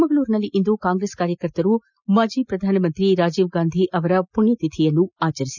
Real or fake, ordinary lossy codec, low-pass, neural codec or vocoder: real; none; 7.2 kHz; none